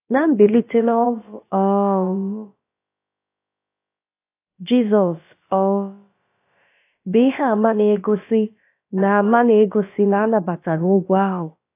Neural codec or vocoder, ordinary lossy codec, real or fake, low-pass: codec, 16 kHz, about 1 kbps, DyCAST, with the encoder's durations; AAC, 24 kbps; fake; 3.6 kHz